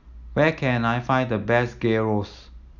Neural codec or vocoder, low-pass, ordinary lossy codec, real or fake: none; 7.2 kHz; none; real